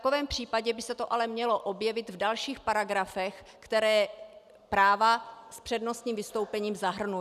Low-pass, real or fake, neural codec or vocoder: 14.4 kHz; real; none